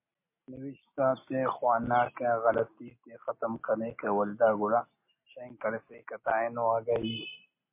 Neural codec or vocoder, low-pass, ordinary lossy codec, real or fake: none; 3.6 kHz; MP3, 24 kbps; real